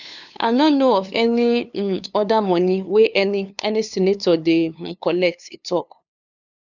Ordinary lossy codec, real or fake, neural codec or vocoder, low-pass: none; fake; codec, 16 kHz, 2 kbps, FunCodec, trained on LibriTTS, 25 frames a second; 7.2 kHz